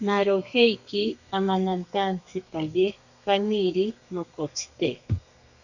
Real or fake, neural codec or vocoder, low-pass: fake; codec, 32 kHz, 1.9 kbps, SNAC; 7.2 kHz